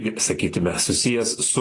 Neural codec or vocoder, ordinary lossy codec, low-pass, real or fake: none; AAC, 32 kbps; 10.8 kHz; real